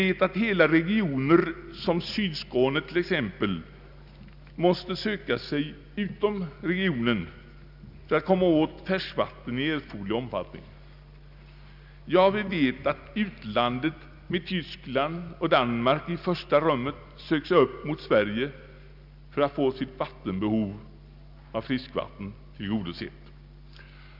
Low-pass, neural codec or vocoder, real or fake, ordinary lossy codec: 5.4 kHz; none; real; none